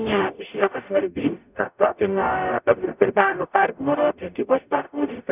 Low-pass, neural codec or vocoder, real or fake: 3.6 kHz; codec, 44.1 kHz, 0.9 kbps, DAC; fake